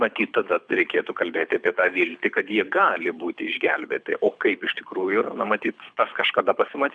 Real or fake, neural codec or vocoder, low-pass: fake; codec, 24 kHz, 6 kbps, HILCodec; 9.9 kHz